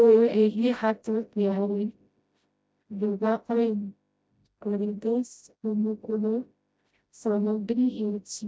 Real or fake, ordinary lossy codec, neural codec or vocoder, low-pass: fake; none; codec, 16 kHz, 0.5 kbps, FreqCodec, smaller model; none